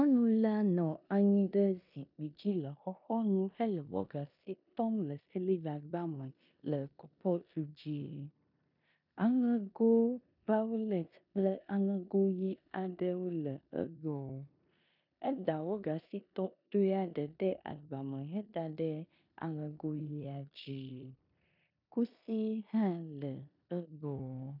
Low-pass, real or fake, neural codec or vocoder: 5.4 kHz; fake; codec, 16 kHz in and 24 kHz out, 0.9 kbps, LongCat-Audio-Codec, four codebook decoder